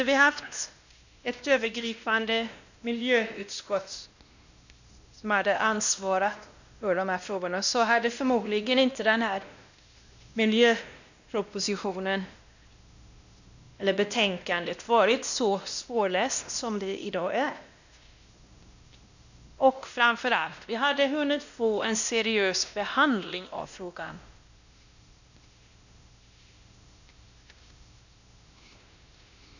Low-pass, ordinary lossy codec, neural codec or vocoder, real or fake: 7.2 kHz; none; codec, 16 kHz, 1 kbps, X-Codec, WavLM features, trained on Multilingual LibriSpeech; fake